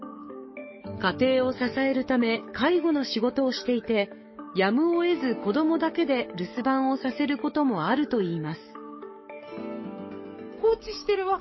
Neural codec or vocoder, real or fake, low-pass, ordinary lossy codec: codec, 44.1 kHz, 7.8 kbps, DAC; fake; 7.2 kHz; MP3, 24 kbps